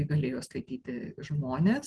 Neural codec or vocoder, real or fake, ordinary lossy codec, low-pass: none; real; Opus, 16 kbps; 10.8 kHz